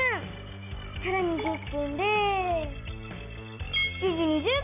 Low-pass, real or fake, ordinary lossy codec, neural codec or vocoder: 3.6 kHz; real; none; none